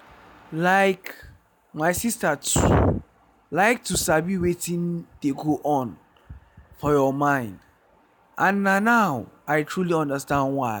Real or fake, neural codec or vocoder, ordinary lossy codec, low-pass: real; none; none; none